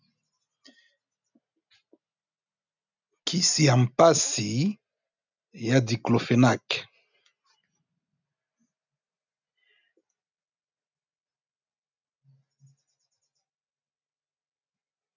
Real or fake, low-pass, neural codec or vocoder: real; 7.2 kHz; none